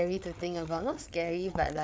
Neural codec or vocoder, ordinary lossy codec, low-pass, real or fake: codec, 16 kHz, 8 kbps, FreqCodec, larger model; none; none; fake